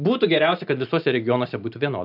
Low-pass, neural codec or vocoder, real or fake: 5.4 kHz; none; real